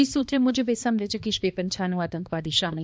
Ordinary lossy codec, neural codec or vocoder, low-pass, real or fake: none; codec, 16 kHz, 2 kbps, X-Codec, HuBERT features, trained on balanced general audio; none; fake